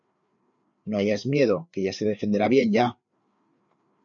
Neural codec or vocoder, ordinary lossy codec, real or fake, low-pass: codec, 16 kHz, 4 kbps, FreqCodec, larger model; MP3, 96 kbps; fake; 7.2 kHz